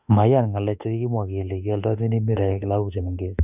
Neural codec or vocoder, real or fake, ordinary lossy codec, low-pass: autoencoder, 48 kHz, 32 numbers a frame, DAC-VAE, trained on Japanese speech; fake; none; 3.6 kHz